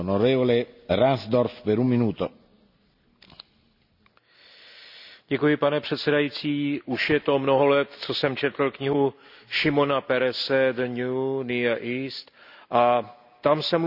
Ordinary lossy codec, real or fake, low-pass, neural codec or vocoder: none; real; 5.4 kHz; none